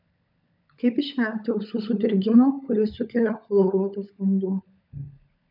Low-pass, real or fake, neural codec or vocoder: 5.4 kHz; fake; codec, 16 kHz, 16 kbps, FunCodec, trained on LibriTTS, 50 frames a second